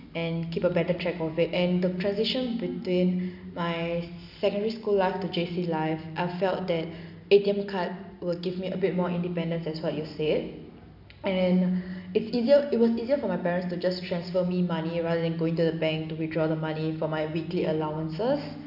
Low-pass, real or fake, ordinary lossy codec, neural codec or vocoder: 5.4 kHz; real; none; none